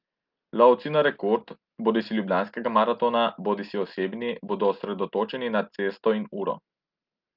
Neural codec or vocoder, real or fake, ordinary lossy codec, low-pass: none; real; Opus, 32 kbps; 5.4 kHz